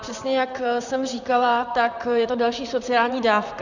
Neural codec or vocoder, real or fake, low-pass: vocoder, 44.1 kHz, 128 mel bands, Pupu-Vocoder; fake; 7.2 kHz